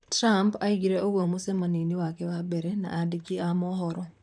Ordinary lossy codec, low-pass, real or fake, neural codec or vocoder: none; 9.9 kHz; fake; vocoder, 44.1 kHz, 128 mel bands, Pupu-Vocoder